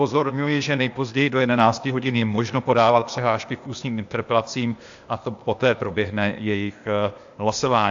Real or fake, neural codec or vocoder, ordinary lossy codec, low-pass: fake; codec, 16 kHz, 0.8 kbps, ZipCodec; AAC, 64 kbps; 7.2 kHz